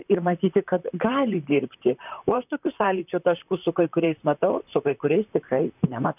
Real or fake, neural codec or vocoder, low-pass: fake; vocoder, 44.1 kHz, 128 mel bands, Pupu-Vocoder; 3.6 kHz